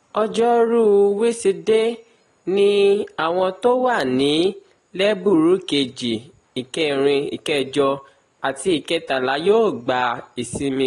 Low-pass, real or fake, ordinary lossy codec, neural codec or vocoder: 10.8 kHz; real; AAC, 32 kbps; none